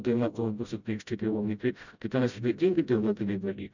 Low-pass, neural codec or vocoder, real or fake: 7.2 kHz; codec, 16 kHz, 0.5 kbps, FreqCodec, smaller model; fake